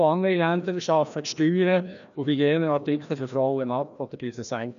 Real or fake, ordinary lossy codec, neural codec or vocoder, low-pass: fake; none; codec, 16 kHz, 1 kbps, FreqCodec, larger model; 7.2 kHz